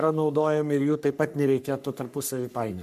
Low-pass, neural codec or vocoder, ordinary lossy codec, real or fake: 14.4 kHz; codec, 44.1 kHz, 3.4 kbps, Pupu-Codec; MP3, 96 kbps; fake